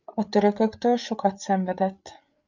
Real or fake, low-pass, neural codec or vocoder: fake; 7.2 kHz; codec, 16 kHz, 8 kbps, FreqCodec, larger model